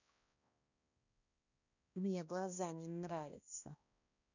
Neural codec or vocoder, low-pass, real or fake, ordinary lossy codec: codec, 16 kHz, 1 kbps, X-Codec, HuBERT features, trained on balanced general audio; 7.2 kHz; fake; none